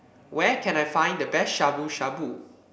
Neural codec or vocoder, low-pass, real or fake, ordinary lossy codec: none; none; real; none